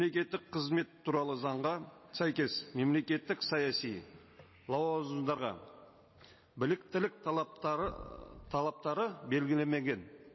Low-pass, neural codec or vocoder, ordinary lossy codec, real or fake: 7.2 kHz; none; MP3, 24 kbps; real